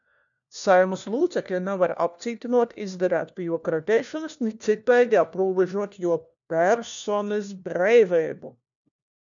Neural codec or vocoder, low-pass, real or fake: codec, 16 kHz, 1 kbps, FunCodec, trained on LibriTTS, 50 frames a second; 7.2 kHz; fake